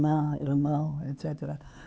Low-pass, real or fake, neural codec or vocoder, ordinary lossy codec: none; fake; codec, 16 kHz, 4 kbps, X-Codec, HuBERT features, trained on LibriSpeech; none